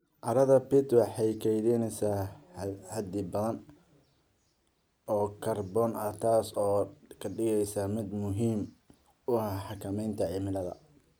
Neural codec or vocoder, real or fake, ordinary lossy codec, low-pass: none; real; none; none